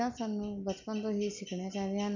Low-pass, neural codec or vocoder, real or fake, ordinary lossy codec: 7.2 kHz; none; real; none